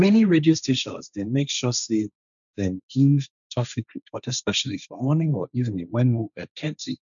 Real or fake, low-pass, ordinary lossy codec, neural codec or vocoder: fake; 7.2 kHz; none; codec, 16 kHz, 1.1 kbps, Voila-Tokenizer